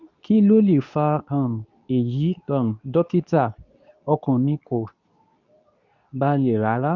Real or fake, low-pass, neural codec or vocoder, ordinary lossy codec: fake; 7.2 kHz; codec, 24 kHz, 0.9 kbps, WavTokenizer, medium speech release version 2; none